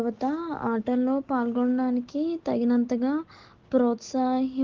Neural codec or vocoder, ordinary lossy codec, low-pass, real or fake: none; Opus, 16 kbps; 7.2 kHz; real